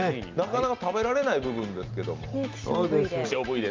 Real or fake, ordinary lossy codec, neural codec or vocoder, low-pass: real; Opus, 24 kbps; none; 7.2 kHz